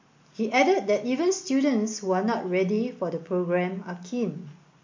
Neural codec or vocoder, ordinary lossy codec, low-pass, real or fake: none; MP3, 48 kbps; 7.2 kHz; real